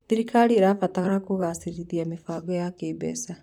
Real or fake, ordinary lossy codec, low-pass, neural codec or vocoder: fake; none; 19.8 kHz; vocoder, 44.1 kHz, 128 mel bands, Pupu-Vocoder